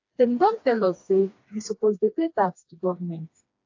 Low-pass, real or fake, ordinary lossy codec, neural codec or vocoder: 7.2 kHz; fake; AAC, 48 kbps; codec, 16 kHz, 2 kbps, FreqCodec, smaller model